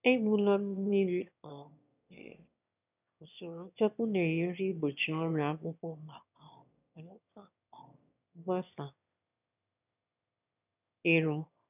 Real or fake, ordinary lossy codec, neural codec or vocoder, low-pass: fake; none; autoencoder, 22.05 kHz, a latent of 192 numbers a frame, VITS, trained on one speaker; 3.6 kHz